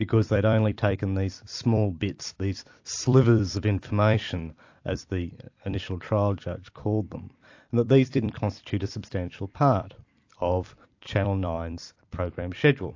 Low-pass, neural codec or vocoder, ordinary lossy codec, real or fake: 7.2 kHz; vocoder, 44.1 kHz, 128 mel bands every 256 samples, BigVGAN v2; AAC, 48 kbps; fake